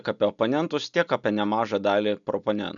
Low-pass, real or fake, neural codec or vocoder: 7.2 kHz; real; none